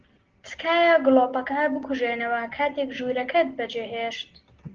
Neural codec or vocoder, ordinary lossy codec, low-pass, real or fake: none; Opus, 16 kbps; 7.2 kHz; real